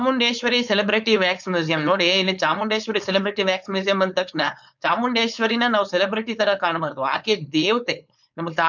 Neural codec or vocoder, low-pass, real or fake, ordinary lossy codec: codec, 16 kHz, 4.8 kbps, FACodec; 7.2 kHz; fake; none